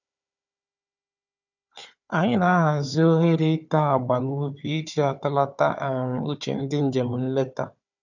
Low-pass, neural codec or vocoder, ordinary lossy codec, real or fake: 7.2 kHz; codec, 16 kHz, 4 kbps, FunCodec, trained on Chinese and English, 50 frames a second; none; fake